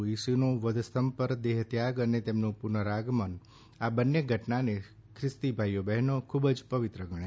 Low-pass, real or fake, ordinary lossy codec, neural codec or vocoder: none; real; none; none